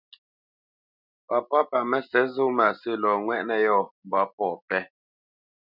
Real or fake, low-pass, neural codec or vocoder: real; 5.4 kHz; none